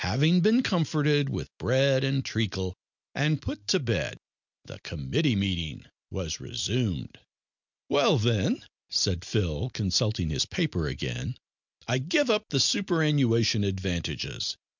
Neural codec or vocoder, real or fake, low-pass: none; real; 7.2 kHz